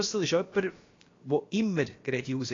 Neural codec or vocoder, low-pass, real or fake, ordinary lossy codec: codec, 16 kHz, about 1 kbps, DyCAST, with the encoder's durations; 7.2 kHz; fake; AAC, 32 kbps